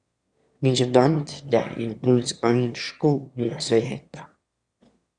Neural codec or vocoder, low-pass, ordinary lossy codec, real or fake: autoencoder, 22.05 kHz, a latent of 192 numbers a frame, VITS, trained on one speaker; 9.9 kHz; Opus, 64 kbps; fake